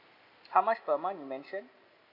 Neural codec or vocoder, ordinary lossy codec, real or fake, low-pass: none; none; real; 5.4 kHz